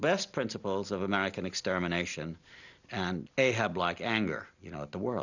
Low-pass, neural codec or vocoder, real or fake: 7.2 kHz; none; real